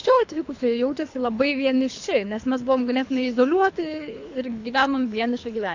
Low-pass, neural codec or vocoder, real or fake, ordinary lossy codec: 7.2 kHz; codec, 24 kHz, 3 kbps, HILCodec; fake; AAC, 48 kbps